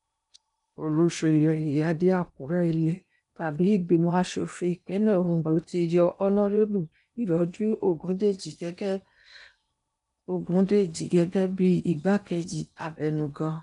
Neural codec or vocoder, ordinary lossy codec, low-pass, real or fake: codec, 16 kHz in and 24 kHz out, 0.8 kbps, FocalCodec, streaming, 65536 codes; none; 10.8 kHz; fake